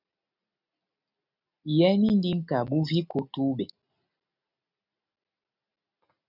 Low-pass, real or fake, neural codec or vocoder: 5.4 kHz; real; none